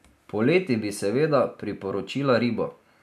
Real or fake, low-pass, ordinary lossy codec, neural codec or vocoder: real; 14.4 kHz; none; none